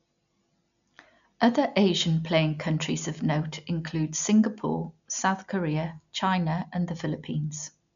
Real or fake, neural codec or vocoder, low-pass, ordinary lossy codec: real; none; 7.2 kHz; none